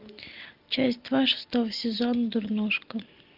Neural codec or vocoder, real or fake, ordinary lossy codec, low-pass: none; real; Opus, 32 kbps; 5.4 kHz